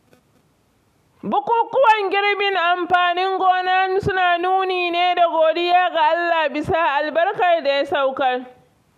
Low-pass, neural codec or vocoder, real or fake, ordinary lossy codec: 14.4 kHz; none; real; none